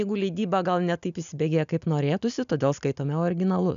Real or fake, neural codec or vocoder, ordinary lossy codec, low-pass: real; none; MP3, 96 kbps; 7.2 kHz